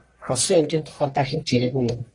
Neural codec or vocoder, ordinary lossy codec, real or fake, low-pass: codec, 44.1 kHz, 1.7 kbps, Pupu-Codec; AAC, 32 kbps; fake; 10.8 kHz